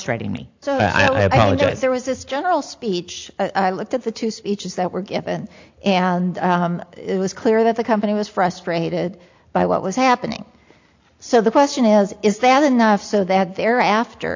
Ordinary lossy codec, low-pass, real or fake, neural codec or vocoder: AAC, 48 kbps; 7.2 kHz; real; none